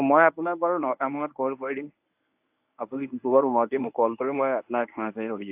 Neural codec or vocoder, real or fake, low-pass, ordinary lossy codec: codec, 24 kHz, 0.9 kbps, WavTokenizer, medium speech release version 1; fake; 3.6 kHz; AAC, 32 kbps